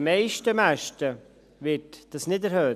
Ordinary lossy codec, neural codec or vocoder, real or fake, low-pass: none; none; real; 14.4 kHz